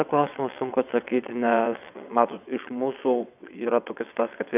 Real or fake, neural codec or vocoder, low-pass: fake; vocoder, 22.05 kHz, 80 mel bands, WaveNeXt; 3.6 kHz